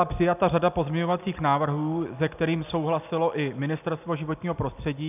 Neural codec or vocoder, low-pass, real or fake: none; 3.6 kHz; real